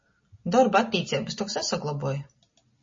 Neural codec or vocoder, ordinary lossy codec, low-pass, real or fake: none; MP3, 32 kbps; 7.2 kHz; real